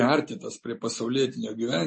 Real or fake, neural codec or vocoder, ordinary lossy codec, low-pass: real; none; MP3, 32 kbps; 10.8 kHz